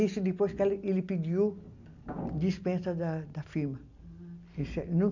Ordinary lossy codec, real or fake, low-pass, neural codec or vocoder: none; real; 7.2 kHz; none